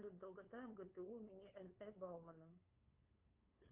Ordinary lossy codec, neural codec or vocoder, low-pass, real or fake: Opus, 32 kbps; codec, 16 kHz, 8 kbps, FunCodec, trained on LibriTTS, 25 frames a second; 3.6 kHz; fake